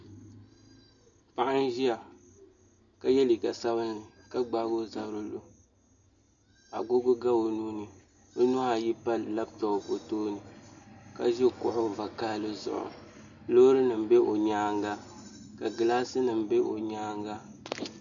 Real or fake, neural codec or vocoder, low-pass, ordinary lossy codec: real; none; 7.2 kHz; MP3, 96 kbps